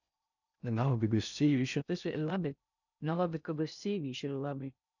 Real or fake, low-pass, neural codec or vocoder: fake; 7.2 kHz; codec, 16 kHz in and 24 kHz out, 0.6 kbps, FocalCodec, streaming, 2048 codes